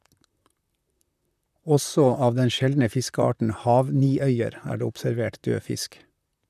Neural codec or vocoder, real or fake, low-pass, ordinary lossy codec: vocoder, 44.1 kHz, 128 mel bands, Pupu-Vocoder; fake; 14.4 kHz; none